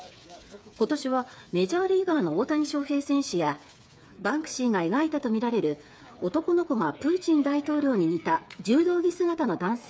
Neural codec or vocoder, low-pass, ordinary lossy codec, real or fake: codec, 16 kHz, 8 kbps, FreqCodec, smaller model; none; none; fake